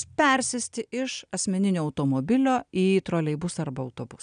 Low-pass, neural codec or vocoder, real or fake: 9.9 kHz; none; real